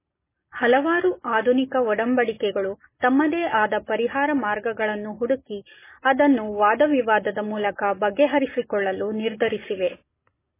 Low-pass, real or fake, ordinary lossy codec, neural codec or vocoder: 3.6 kHz; real; MP3, 16 kbps; none